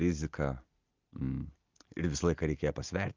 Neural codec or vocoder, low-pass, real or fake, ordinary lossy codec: none; 7.2 kHz; real; Opus, 24 kbps